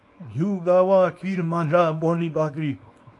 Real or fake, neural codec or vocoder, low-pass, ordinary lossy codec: fake; codec, 24 kHz, 0.9 kbps, WavTokenizer, small release; 10.8 kHz; AAC, 48 kbps